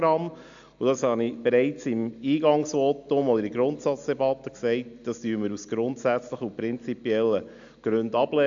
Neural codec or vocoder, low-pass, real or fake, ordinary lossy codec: none; 7.2 kHz; real; AAC, 64 kbps